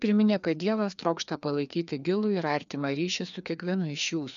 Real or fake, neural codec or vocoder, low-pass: fake; codec, 16 kHz, 2 kbps, FreqCodec, larger model; 7.2 kHz